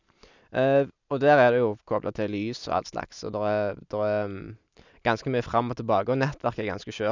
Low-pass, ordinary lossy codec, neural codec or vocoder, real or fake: 7.2 kHz; none; none; real